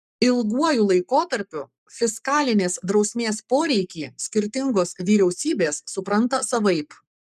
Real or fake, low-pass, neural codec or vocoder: fake; 14.4 kHz; codec, 44.1 kHz, 7.8 kbps, Pupu-Codec